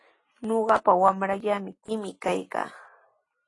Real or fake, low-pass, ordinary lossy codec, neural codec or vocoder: real; 10.8 kHz; AAC, 32 kbps; none